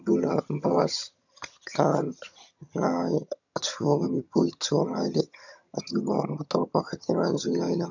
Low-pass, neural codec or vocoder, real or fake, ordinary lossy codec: 7.2 kHz; vocoder, 22.05 kHz, 80 mel bands, HiFi-GAN; fake; none